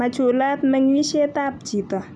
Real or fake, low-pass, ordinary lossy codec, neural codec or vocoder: real; none; none; none